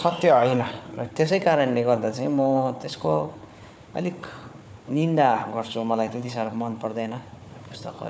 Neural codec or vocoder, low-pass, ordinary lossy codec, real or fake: codec, 16 kHz, 4 kbps, FunCodec, trained on Chinese and English, 50 frames a second; none; none; fake